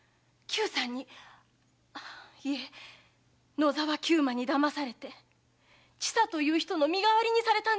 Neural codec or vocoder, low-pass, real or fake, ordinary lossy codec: none; none; real; none